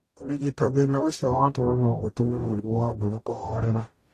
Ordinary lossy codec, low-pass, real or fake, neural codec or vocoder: AAC, 48 kbps; 14.4 kHz; fake; codec, 44.1 kHz, 0.9 kbps, DAC